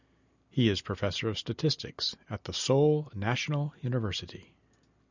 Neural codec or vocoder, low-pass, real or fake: none; 7.2 kHz; real